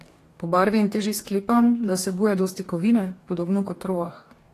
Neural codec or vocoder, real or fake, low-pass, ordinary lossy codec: codec, 44.1 kHz, 2.6 kbps, DAC; fake; 14.4 kHz; AAC, 48 kbps